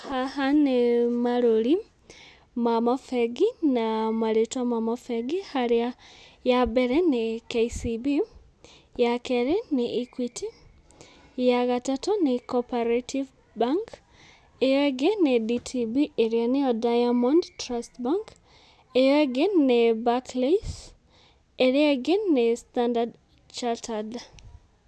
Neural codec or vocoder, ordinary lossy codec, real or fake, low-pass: none; none; real; none